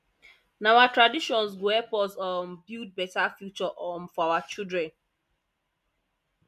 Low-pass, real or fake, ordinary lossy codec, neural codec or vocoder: 14.4 kHz; real; none; none